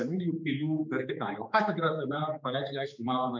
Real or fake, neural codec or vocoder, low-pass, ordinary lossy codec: fake; codec, 16 kHz, 4 kbps, X-Codec, HuBERT features, trained on general audio; 7.2 kHz; MP3, 64 kbps